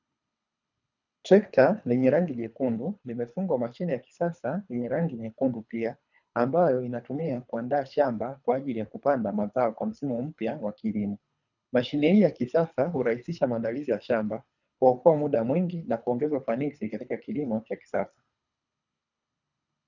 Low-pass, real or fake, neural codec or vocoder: 7.2 kHz; fake; codec, 24 kHz, 3 kbps, HILCodec